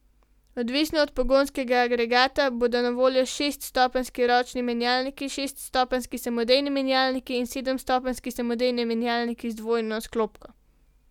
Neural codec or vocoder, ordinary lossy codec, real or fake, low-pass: none; none; real; 19.8 kHz